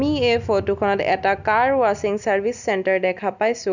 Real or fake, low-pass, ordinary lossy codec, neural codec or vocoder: real; 7.2 kHz; none; none